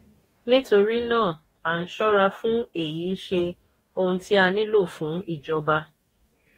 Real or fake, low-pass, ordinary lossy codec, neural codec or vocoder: fake; 19.8 kHz; AAC, 48 kbps; codec, 44.1 kHz, 2.6 kbps, DAC